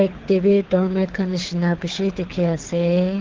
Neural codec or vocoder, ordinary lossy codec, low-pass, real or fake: codec, 24 kHz, 6 kbps, HILCodec; Opus, 16 kbps; 7.2 kHz; fake